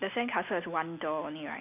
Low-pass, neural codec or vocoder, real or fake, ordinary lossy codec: 3.6 kHz; none; real; none